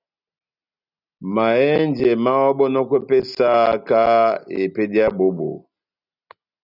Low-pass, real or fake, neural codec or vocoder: 5.4 kHz; real; none